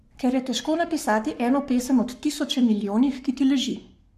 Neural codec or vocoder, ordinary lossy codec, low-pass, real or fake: codec, 44.1 kHz, 7.8 kbps, Pupu-Codec; none; 14.4 kHz; fake